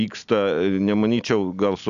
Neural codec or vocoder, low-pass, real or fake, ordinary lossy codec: none; 7.2 kHz; real; AAC, 96 kbps